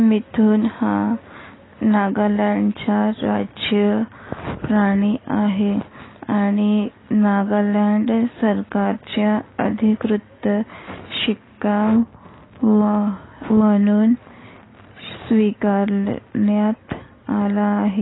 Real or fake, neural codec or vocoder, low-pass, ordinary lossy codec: real; none; 7.2 kHz; AAC, 16 kbps